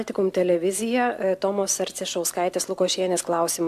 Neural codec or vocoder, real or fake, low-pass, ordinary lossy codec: none; real; 14.4 kHz; MP3, 96 kbps